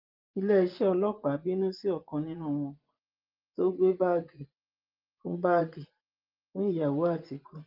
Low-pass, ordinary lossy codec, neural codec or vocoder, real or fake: 5.4 kHz; Opus, 32 kbps; vocoder, 24 kHz, 100 mel bands, Vocos; fake